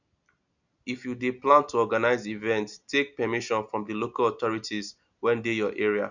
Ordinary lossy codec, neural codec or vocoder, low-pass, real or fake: none; none; 7.2 kHz; real